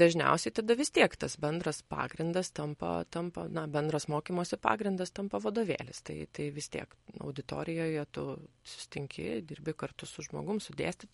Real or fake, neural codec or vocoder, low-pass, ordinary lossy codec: real; none; 19.8 kHz; MP3, 48 kbps